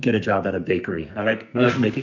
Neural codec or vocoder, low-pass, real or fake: codec, 44.1 kHz, 2.6 kbps, SNAC; 7.2 kHz; fake